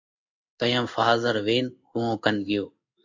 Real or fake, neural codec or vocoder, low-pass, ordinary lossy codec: fake; codec, 16 kHz in and 24 kHz out, 1 kbps, XY-Tokenizer; 7.2 kHz; MP3, 48 kbps